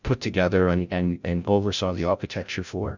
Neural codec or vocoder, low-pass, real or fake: codec, 16 kHz, 0.5 kbps, FreqCodec, larger model; 7.2 kHz; fake